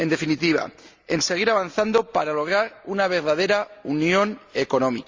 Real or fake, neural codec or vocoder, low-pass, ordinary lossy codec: real; none; 7.2 kHz; Opus, 32 kbps